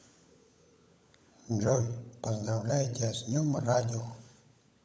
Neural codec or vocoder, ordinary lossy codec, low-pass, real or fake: codec, 16 kHz, 16 kbps, FunCodec, trained on LibriTTS, 50 frames a second; none; none; fake